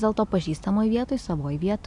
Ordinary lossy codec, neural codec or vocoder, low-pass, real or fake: AAC, 64 kbps; none; 10.8 kHz; real